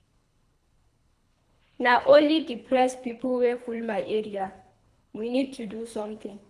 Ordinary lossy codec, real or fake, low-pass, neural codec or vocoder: none; fake; none; codec, 24 kHz, 3 kbps, HILCodec